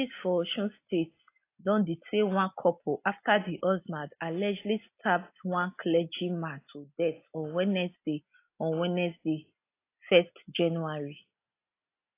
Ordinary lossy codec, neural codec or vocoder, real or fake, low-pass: AAC, 24 kbps; none; real; 3.6 kHz